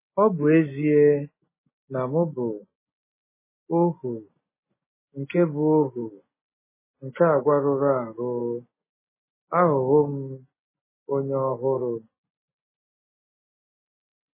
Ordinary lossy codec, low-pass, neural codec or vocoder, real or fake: MP3, 16 kbps; 3.6 kHz; none; real